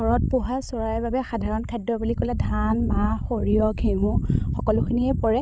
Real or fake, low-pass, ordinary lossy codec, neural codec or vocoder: fake; none; none; codec, 16 kHz, 16 kbps, FreqCodec, larger model